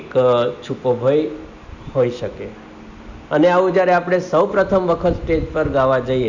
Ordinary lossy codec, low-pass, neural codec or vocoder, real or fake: none; 7.2 kHz; none; real